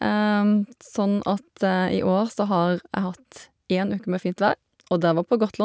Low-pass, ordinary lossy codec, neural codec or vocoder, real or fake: none; none; none; real